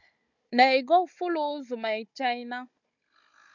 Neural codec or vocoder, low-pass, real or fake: codec, 16 kHz, 16 kbps, FunCodec, trained on Chinese and English, 50 frames a second; 7.2 kHz; fake